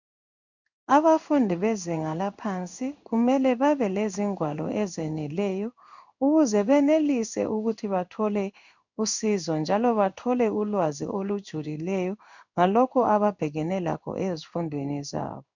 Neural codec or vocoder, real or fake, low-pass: codec, 16 kHz in and 24 kHz out, 1 kbps, XY-Tokenizer; fake; 7.2 kHz